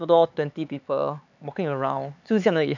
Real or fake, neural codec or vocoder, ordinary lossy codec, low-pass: fake; codec, 16 kHz, 4 kbps, X-Codec, HuBERT features, trained on LibriSpeech; none; 7.2 kHz